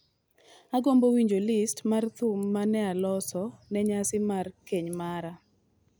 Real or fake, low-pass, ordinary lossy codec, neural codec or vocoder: real; none; none; none